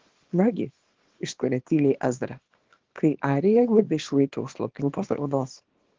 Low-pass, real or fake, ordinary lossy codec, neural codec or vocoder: 7.2 kHz; fake; Opus, 16 kbps; codec, 24 kHz, 0.9 kbps, WavTokenizer, small release